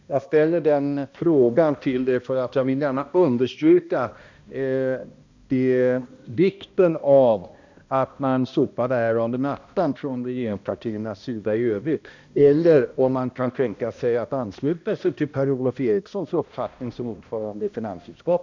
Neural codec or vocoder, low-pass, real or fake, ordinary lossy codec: codec, 16 kHz, 1 kbps, X-Codec, HuBERT features, trained on balanced general audio; 7.2 kHz; fake; MP3, 64 kbps